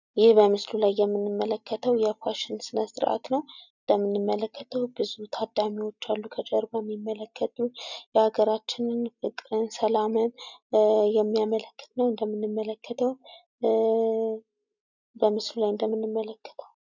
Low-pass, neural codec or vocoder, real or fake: 7.2 kHz; none; real